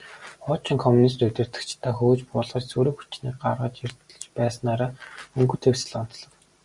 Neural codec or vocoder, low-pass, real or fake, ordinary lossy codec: none; 10.8 kHz; real; Opus, 64 kbps